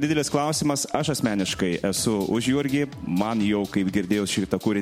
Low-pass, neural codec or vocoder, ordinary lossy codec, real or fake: 19.8 kHz; none; MP3, 64 kbps; real